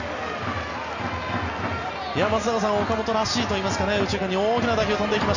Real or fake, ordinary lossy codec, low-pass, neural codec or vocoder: real; none; 7.2 kHz; none